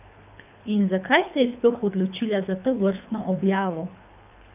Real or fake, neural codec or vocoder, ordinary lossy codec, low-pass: fake; codec, 24 kHz, 3 kbps, HILCodec; none; 3.6 kHz